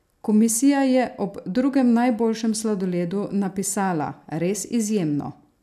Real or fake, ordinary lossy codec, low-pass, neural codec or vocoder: real; none; 14.4 kHz; none